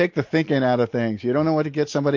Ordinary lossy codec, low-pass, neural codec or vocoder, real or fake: MP3, 48 kbps; 7.2 kHz; none; real